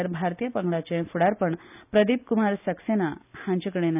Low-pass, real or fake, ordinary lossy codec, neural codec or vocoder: 3.6 kHz; real; none; none